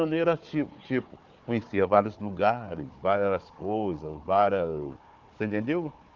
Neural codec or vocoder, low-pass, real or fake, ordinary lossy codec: codec, 16 kHz, 4 kbps, FunCodec, trained on Chinese and English, 50 frames a second; 7.2 kHz; fake; Opus, 32 kbps